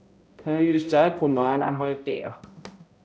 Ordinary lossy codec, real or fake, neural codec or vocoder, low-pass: none; fake; codec, 16 kHz, 0.5 kbps, X-Codec, HuBERT features, trained on balanced general audio; none